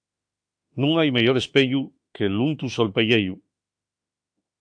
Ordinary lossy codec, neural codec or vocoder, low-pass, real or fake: AAC, 64 kbps; autoencoder, 48 kHz, 32 numbers a frame, DAC-VAE, trained on Japanese speech; 9.9 kHz; fake